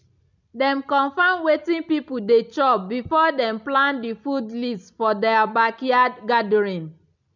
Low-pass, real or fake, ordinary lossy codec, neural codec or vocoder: 7.2 kHz; real; none; none